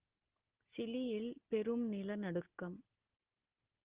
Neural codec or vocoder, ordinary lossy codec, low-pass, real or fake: none; Opus, 16 kbps; 3.6 kHz; real